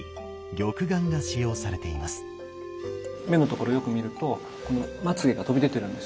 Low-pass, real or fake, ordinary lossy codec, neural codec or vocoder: none; real; none; none